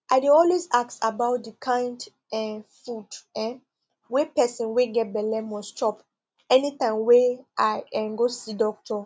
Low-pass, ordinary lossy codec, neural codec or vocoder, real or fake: none; none; none; real